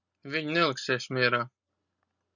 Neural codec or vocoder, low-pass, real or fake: none; 7.2 kHz; real